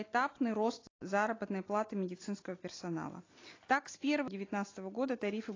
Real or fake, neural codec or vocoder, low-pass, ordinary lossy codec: real; none; 7.2 kHz; AAC, 32 kbps